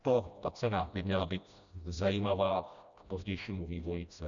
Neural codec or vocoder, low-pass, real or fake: codec, 16 kHz, 1 kbps, FreqCodec, smaller model; 7.2 kHz; fake